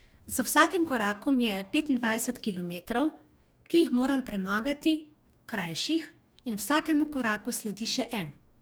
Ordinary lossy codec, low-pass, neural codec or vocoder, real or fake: none; none; codec, 44.1 kHz, 2.6 kbps, DAC; fake